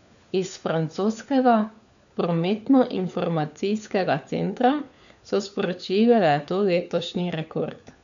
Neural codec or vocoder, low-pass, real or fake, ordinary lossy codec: codec, 16 kHz, 4 kbps, FunCodec, trained on LibriTTS, 50 frames a second; 7.2 kHz; fake; MP3, 96 kbps